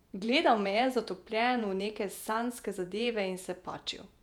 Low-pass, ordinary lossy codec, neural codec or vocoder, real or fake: 19.8 kHz; none; vocoder, 48 kHz, 128 mel bands, Vocos; fake